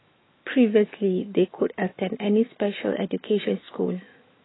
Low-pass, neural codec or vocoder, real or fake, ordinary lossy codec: 7.2 kHz; autoencoder, 48 kHz, 128 numbers a frame, DAC-VAE, trained on Japanese speech; fake; AAC, 16 kbps